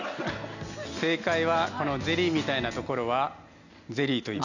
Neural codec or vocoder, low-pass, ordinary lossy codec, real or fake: none; 7.2 kHz; none; real